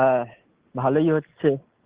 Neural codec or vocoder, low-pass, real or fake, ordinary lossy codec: none; 3.6 kHz; real; Opus, 16 kbps